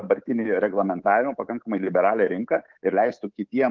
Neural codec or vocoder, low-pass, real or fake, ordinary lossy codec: codec, 24 kHz, 3.1 kbps, DualCodec; 7.2 kHz; fake; Opus, 16 kbps